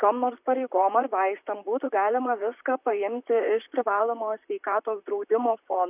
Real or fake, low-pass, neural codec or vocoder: fake; 3.6 kHz; vocoder, 44.1 kHz, 128 mel bands, Pupu-Vocoder